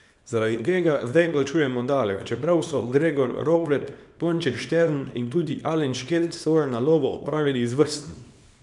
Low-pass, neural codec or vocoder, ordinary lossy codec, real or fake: 10.8 kHz; codec, 24 kHz, 0.9 kbps, WavTokenizer, small release; none; fake